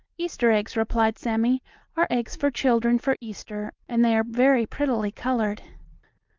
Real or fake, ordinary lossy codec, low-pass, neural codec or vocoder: real; Opus, 32 kbps; 7.2 kHz; none